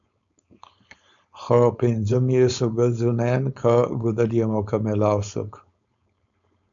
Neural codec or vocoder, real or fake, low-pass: codec, 16 kHz, 4.8 kbps, FACodec; fake; 7.2 kHz